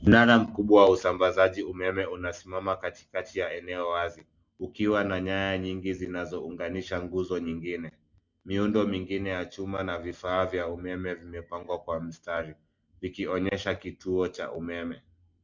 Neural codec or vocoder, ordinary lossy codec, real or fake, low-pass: none; Opus, 64 kbps; real; 7.2 kHz